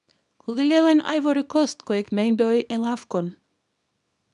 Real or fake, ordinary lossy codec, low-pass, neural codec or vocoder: fake; none; 10.8 kHz; codec, 24 kHz, 0.9 kbps, WavTokenizer, small release